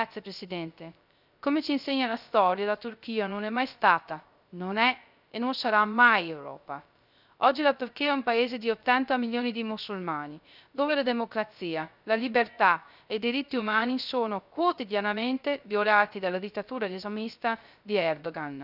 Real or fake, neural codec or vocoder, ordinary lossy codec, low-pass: fake; codec, 16 kHz, 0.3 kbps, FocalCodec; none; 5.4 kHz